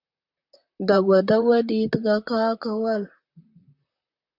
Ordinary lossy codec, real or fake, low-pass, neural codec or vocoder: AAC, 48 kbps; fake; 5.4 kHz; vocoder, 44.1 kHz, 128 mel bands, Pupu-Vocoder